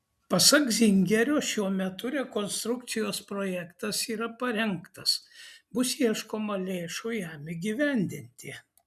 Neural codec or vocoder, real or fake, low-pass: none; real; 14.4 kHz